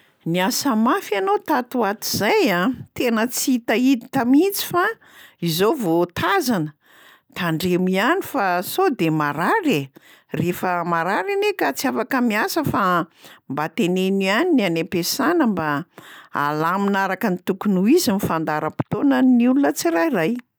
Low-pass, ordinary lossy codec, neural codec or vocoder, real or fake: none; none; none; real